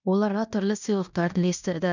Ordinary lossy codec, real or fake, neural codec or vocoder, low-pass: none; fake; codec, 16 kHz in and 24 kHz out, 0.9 kbps, LongCat-Audio-Codec, fine tuned four codebook decoder; 7.2 kHz